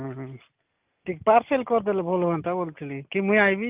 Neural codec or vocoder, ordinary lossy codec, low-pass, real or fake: none; Opus, 24 kbps; 3.6 kHz; real